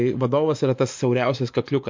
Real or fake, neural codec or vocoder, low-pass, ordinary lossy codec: fake; vocoder, 24 kHz, 100 mel bands, Vocos; 7.2 kHz; MP3, 48 kbps